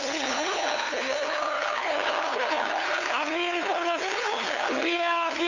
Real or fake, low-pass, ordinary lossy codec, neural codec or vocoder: fake; 7.2 kHz; none; codec, 16 kHz, 2 kbps, FunCodec, trained on LibriTTS, 25 frames a second